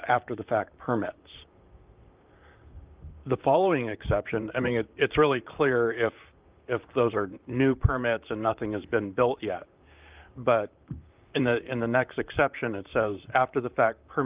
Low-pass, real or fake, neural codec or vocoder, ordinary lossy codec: 3.6 kHz; fake; vocoder, 44.1 kHz, 128 mel bands, Pupu-Vocoder; Opus, 32 kbps